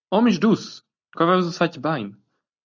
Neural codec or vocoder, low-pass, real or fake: none; 7.2 kHz; real